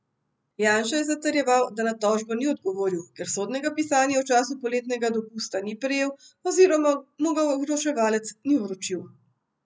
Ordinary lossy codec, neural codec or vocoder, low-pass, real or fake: none; none; none; real